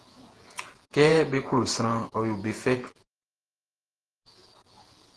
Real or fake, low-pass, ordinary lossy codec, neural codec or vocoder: fake; 10.8 kHz; Opus, 16 kbps; vocoder, 48 kHz, 128 mel bands, Vocos